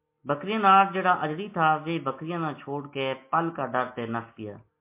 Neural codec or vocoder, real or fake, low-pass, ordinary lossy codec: none; real; 3.6 kHz; MP3, 24 kbps